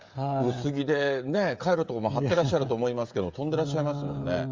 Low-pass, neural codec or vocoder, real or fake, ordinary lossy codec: 7.2 kHz; codec, 16 kHz, 16 kbps, FreqCodec, smaller model; fake; Opus, 32 kbps